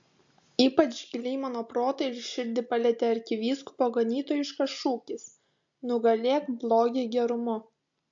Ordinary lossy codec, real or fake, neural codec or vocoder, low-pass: AAC, 64 kbps; real; none; 7.2 kHz